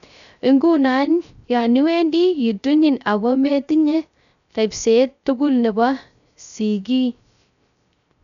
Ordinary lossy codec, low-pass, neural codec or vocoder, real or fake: none; 7.2 kHz; codec, 16 kHz, 0.3 kbps, FocalCodec; fake